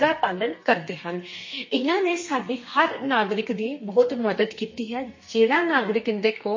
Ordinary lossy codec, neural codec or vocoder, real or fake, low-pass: MP3, 32 kbps; codec, 32 kHz, 1.9 kbps, SNAC; fake; 7.2 kHz